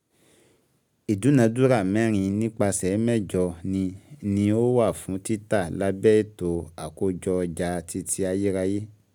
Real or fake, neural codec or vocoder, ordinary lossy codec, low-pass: real; none; none; none